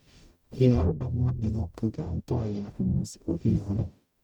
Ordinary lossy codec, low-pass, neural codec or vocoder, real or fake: none; 19.8 kHz; codec, 44.1 kHz, 0.9 kbps, DAC; fake